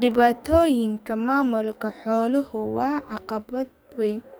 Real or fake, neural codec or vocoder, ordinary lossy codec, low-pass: fake; codec, 44.1 kHz, 2.6 kbps, SNAC; none; none